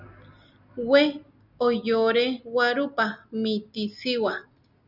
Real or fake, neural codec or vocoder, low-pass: real; none; 5.4 kHz